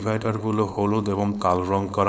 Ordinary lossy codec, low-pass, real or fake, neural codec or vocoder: none; none; fake; codec, 16 kHz, 4.8 kbps, FACodec